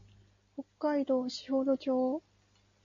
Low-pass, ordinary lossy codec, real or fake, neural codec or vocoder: 7.2 kHz; MP3, 48 kbps; real; none